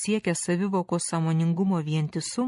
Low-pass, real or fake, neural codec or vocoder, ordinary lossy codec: 14.4 kHz; fake; vocoder, 44.1 kHz, 128 mel bands every 256 samples, BigVGAN v2; MP3, 48 kbps